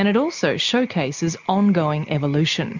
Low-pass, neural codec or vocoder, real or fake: 7.2 kHz; none; real